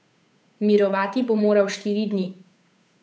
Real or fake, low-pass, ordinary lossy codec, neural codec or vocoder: fake; none; none; codec, 16 kHz, 8 kbps, FunCodec, trained on Chinese and English, 25 frames a second